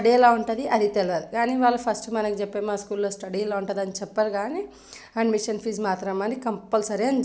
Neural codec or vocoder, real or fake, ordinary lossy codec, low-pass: none; real; none; none